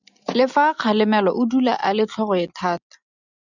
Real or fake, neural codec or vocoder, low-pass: real; none; 7.2 kHz